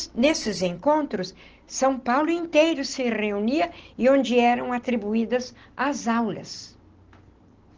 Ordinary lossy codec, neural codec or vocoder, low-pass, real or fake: Opus, 16 kbps; none; 7.2 kHz; real